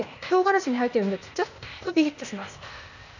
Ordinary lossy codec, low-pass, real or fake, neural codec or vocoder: none; 7.2 kHz; fake; codec, 16 kHz, 0.8 kbps, ZipCodec